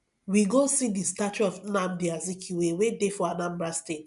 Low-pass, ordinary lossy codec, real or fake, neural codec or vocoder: 10.8 kHz; none; real; none